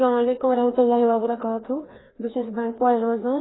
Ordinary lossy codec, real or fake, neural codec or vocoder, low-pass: AAC, 16 kbps; fake; codec, 16 kHz, 2 kbps, FreqCodec, larger model; 7.2 kHz